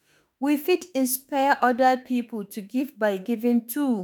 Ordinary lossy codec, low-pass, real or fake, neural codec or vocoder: none; none; fake; autoencoder, 48 kHz, 32 numbers a frame, DAC-VAE, trained on Japanese speech